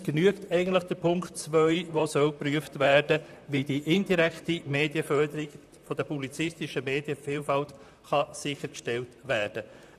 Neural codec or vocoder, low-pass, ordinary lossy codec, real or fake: vocoder, 44.1 kHz, 128 mel bands, Pupu-Vocoder; 14.4 kHz; none; fake